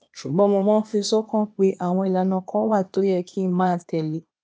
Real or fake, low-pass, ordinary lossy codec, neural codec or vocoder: fake; none; none; codec, 16 kHz, 0.8 kbps, ZipCodec